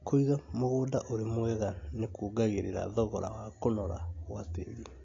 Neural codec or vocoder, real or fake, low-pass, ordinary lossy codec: none; real; 7.2 kHz; AAC, 32 kbps